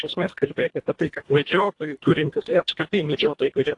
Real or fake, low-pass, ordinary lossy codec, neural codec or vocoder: fake; 10.8 kHz; AAC, 48 kbps; codec, 24 kHz, 1.5 kbps, HILCodec